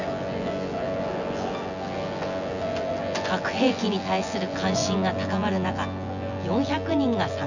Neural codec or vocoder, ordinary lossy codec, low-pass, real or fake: vocoder, 24 kHz, 100 mel bands, Vocos; none; 7.2 kHz; fake